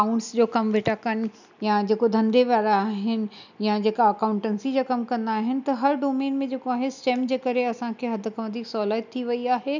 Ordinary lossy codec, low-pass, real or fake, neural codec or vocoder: none; 7.2 kHz; real; none